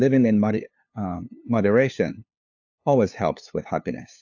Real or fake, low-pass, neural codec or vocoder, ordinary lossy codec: fake; 7.2 kHz; codec, 16 kHz, 2 kbps, FunCodec, trained on LibriTTS, 25 frames a second; AAC, 48 kbps